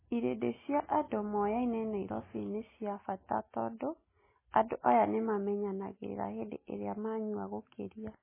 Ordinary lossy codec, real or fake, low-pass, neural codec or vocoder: MP3, 16 kbps; real; 3.6 kHz; none